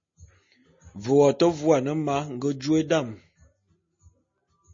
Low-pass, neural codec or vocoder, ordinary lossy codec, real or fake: 7.2 kHz; none; MP3, 32 kbps; real